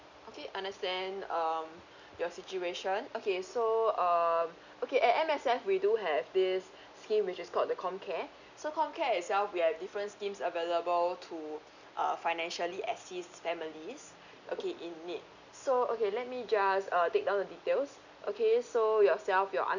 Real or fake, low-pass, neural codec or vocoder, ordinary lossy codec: real; 7.2 kHz; none; none